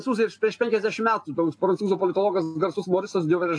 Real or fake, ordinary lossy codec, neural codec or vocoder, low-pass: real; AAC, 48 kbps; none; 9.9 kHz